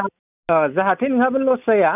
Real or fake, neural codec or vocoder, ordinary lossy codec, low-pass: real; none; none; 3.6 kHz